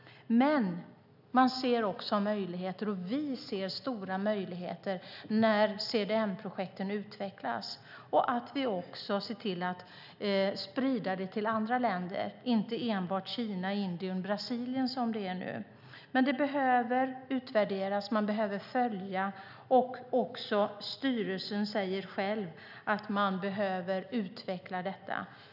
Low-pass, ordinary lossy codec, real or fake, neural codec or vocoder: 5.4 kHz; none; real; none